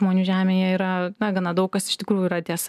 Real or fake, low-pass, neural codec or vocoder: real; 14.4 kHz; none